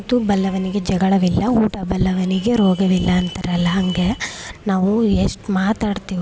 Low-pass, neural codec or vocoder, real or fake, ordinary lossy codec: none; none; real; none